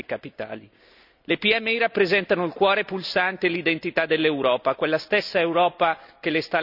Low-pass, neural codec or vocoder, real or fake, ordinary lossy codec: 5.4 kHz; none; real; none